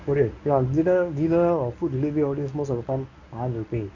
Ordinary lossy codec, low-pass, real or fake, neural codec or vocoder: none; 7.2 kHz; fake; codec, 24 kHz, 0.9 kbps, WavTokenizer, medium speech release version 1